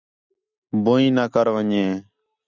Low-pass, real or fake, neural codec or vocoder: 7.2 kHz; real; none